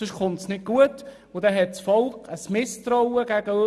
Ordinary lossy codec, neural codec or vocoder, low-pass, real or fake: none; none; none; real